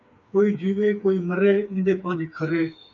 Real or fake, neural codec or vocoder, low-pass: fake; codec, 16 kHz, 4 kbps, FreqCodec, smaller model; 7.2 kHz